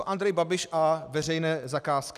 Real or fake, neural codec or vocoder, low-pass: real; none; 14.4 kHz